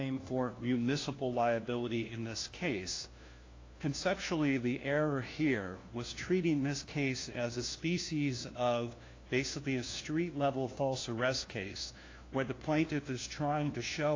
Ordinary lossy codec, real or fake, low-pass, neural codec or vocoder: AAC, 32 kbps; fake; 7.2 kHz; codec, 16 kHz, 1 kbps, FunCodec, trained on LibriTTS, 50 frames a second